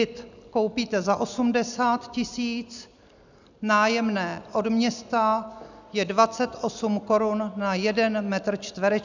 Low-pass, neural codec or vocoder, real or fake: 7.2 kHz; none; real